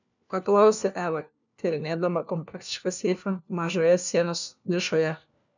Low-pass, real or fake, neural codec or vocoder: 7.2 kHz; fake; codec, 16 kHz, 1 kbps, FunCodec, trained on LibriTTS, 50 frames a second